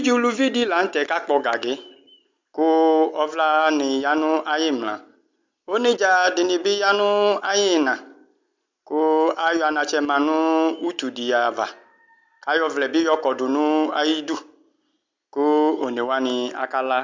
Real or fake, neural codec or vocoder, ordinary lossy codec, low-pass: real; none; MP3, 64 kbps; 7.2 kHz